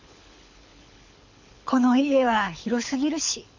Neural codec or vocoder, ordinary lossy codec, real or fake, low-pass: codec, 24 kHz, 6 kbps, HILCodec; Opus, 64 kbps; fake; 7.2 kHz